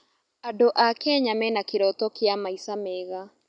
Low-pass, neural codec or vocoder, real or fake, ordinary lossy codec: 9.9 kHz; none; real; none